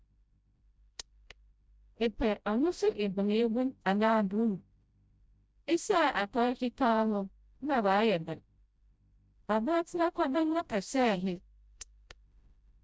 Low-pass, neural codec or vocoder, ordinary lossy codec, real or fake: none; codec, 16 kHz, 0.5 kbps, FreqCodec, smaller model; none; fake